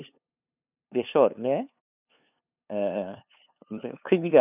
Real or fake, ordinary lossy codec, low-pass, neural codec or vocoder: fake; none; 3.6 kHz; codec, 16 kHz, 2 kbps, FunCodec, trained on LibriTTS, 25 frames a second